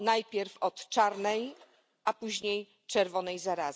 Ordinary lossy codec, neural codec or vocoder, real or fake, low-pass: none; none; real; none